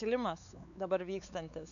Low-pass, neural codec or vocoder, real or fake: 7.2 kHz; codec, 16 kHz, 4 kbps, X-Codec, WavLM features, trained on Multilingual LibriSpeech; fake